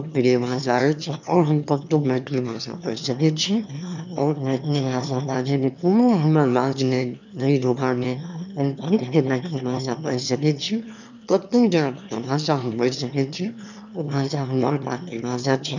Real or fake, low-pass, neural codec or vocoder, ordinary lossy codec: fake; 7.2 kHz; autoencoder, 22.05 kHz, a latent of 192 numbers a frame, VITS, trained on one speaker; none